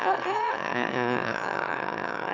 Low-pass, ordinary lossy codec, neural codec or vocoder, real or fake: 7.2 kHz; none; autoencoder, 22.05 kHz, a latent of 192 numbers a frame, VITS, trained on one speaker; fake